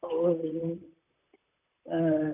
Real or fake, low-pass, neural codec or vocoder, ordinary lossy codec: fake; 3.6 kHz; vocoder, 44.1 kHz, 128 mel bands, Pupu-Vocoder; none